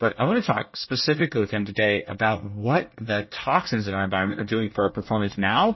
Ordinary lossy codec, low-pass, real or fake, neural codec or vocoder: MP3, 24 kbps; 7.2 kHz; fake; codec, 24 kHz, 1 kbps, SNAC